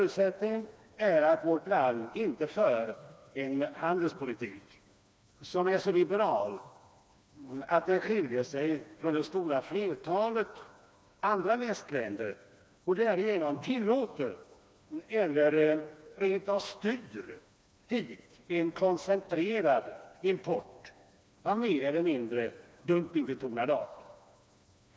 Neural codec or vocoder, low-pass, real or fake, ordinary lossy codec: codec, 16 kHz, 2 kbps, FreqCodec, smaller model; none; fake; none